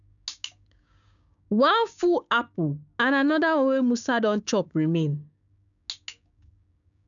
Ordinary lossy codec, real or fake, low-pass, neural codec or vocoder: none; fake; 7.2 kHz; codec, 16 kHz, 6 kbps, DAC